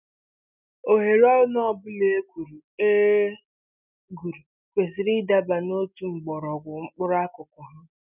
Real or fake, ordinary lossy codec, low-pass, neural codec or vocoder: real; none; 3.6 kHz; none